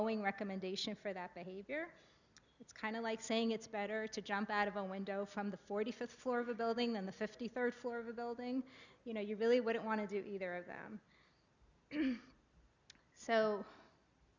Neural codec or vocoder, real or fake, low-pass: none; real; 7.2 kHz